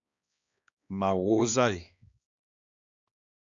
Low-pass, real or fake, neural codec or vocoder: 7.2 kHz; fake; codec, 16 kHz, 2 kbps, X-Codec, HuBERT features, trained on balanced general audio